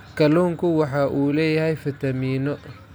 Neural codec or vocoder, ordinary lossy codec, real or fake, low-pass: none; none; real; none